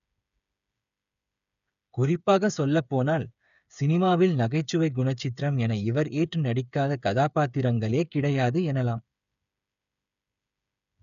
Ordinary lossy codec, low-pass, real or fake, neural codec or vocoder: none; 7.2 kHz; fake; codec, 16 kHz, 8 kbps, FreqCodec, smaller model